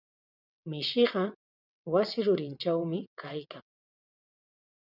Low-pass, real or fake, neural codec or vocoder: 5.4 kHz; fake; vocoder, 22.05 kHz, 80 mel bands, WaveNeXt